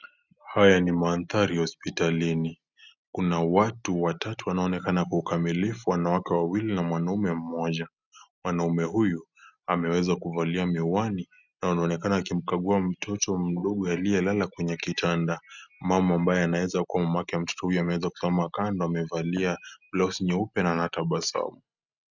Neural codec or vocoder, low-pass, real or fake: none; 7.2 kHz; real